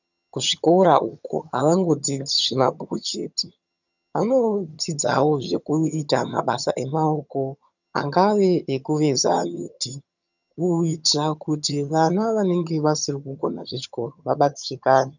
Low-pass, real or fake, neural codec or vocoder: 7.2 kHz; fake; vocoder, 22.05 kHz, 80 mel bands, HiFi-GAN